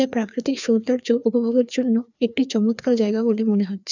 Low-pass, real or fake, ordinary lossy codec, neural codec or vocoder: 7.2 kHz; fake; none; codec, 16 kHz, 2 kbps, FreqCodec, larger model